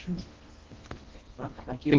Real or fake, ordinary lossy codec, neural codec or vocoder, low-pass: fake; Opus, 16 kbps; codec, 24 kHz, 1.5 kbps, HILCodec; 7.2 kHz